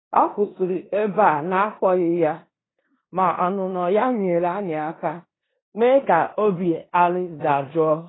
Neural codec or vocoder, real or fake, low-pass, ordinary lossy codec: codec, 16 kHz in and 24 kHz out, 0.9 kbps, LongCat-Audio-Codec, four codebook decoder; fake; 7.2 kHz; AAC, 16 kbps